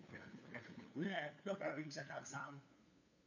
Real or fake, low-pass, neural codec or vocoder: fake; 7.2 kHz; codec, 16 kHz, 2 kbps, FunCodec, trained on LibriTTS, 25 frames a second